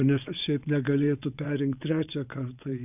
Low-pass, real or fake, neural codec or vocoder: 3.6 kHz; fake; vocoder, 22.05 kHz, 80 mel bands, WaveNeXt